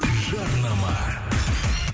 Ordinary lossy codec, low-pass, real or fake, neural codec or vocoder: none; none; real; none